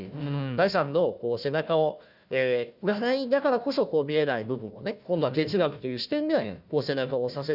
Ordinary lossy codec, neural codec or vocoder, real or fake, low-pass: none; codec, 16 kHz, 1 kbps, FunCodec, trained on Chinese and English, 50 frames a second; fake; 5.4 kHz